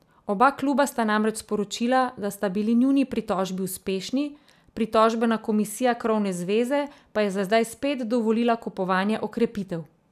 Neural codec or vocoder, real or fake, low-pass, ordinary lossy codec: none; real; 14.4 kHz; none